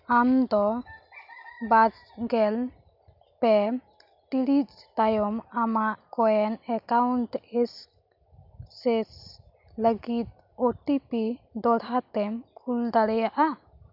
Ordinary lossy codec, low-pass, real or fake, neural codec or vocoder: none; 5.4 kHz; real; none